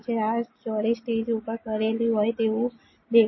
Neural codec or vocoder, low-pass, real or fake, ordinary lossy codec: none; 7.2 kHz; real; MP3, 24 kbps